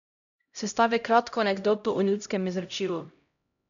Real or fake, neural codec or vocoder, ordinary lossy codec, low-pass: fake; codec, 16 kHz, 0.5 kbps, X-Codec, HuBERT features, trained on LibriSpeech; none; 7.2 kHz